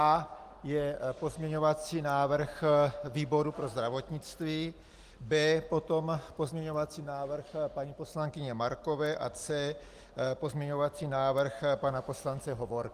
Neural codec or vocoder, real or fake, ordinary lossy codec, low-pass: none; real; Opus, 24 kbps; 14.4 kHz